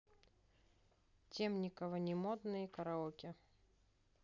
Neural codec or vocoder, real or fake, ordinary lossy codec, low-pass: none; real; none; 7.2 kHz